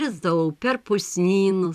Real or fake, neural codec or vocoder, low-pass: fake; vocoder, 44.1 kHz, 128 mel bands every 256 samples, BigVGAN v2; 14.4 kHz